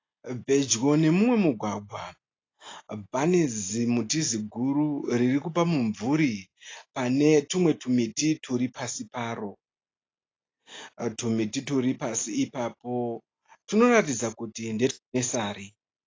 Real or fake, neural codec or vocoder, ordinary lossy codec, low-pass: real; none; AAC, 32 kbps; 7.2 kHz